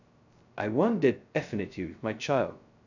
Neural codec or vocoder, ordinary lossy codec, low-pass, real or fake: codec, 16 kHz, 0.2 kbps, FocalCodec; none; 7.2 kHz; fake